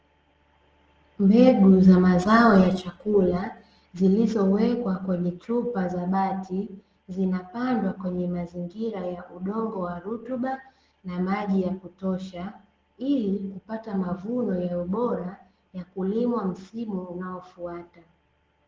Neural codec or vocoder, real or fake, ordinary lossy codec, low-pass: none; real; Opus, 16 kbps; 7.2 kHz